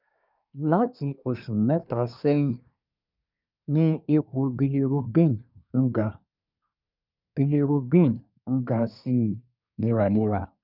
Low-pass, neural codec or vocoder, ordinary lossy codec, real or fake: 5.4 kHz; codec, 24 kHz, 1 kbps, SNAC; none; fake